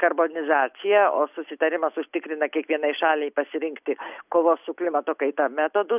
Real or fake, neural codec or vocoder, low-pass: real; none; 3.6 kHz